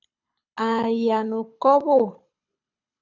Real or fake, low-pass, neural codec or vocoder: fake; 7.2 kHz; codec, 24 kHz, 6 kbps, HILCodec